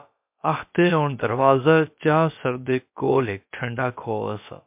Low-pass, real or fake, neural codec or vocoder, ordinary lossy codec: 3.6 kHz; fake; codec, 16 kHz, about 1 kbps, DyCAST, with the encoder's durations; MP3, 32 kbps